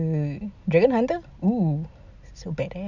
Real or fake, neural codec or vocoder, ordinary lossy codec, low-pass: real; none; none; 7.2 kHz